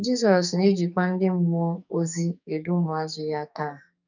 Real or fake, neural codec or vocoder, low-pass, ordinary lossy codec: fake; codec, 44.1 kHz, 2.6 kbps, SNAC; 7.2 kHz; none